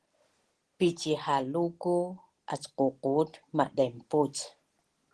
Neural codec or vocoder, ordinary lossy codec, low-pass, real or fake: none; Opus, 16 kbps; 10.8 kHz; real